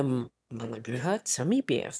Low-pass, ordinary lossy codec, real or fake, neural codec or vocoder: none; none; fake; autoencoder, 22.05 kHz, a latent of 192 numbers a frame, VITS, trained on one speaker